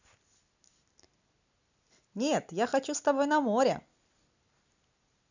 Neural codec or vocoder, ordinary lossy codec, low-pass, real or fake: none; none; 7.2 kHz; real